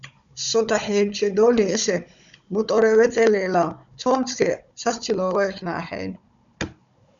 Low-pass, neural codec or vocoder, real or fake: 7.2 kHz; codec, 16 kHz, 16 kbps, FunCodec, trained on Chinese and English, 50 frames a second; fake